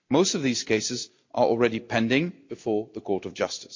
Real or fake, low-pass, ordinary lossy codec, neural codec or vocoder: real; 7.2 kHz; MP3, 48 kbps; none